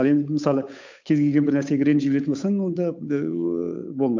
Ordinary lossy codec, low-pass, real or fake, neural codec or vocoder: MP3, 64 kbps; 7.2 kHz; fake; codec, 16 kHz, 8 kbps, FunCodec, trained on Chinese and English, 25 frames a second